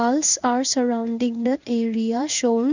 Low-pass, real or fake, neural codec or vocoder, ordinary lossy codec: 7.2 kHz; fake; codec, 16 kHz in and 24 kHz out, 1 kbps, XY-Tokenizer; none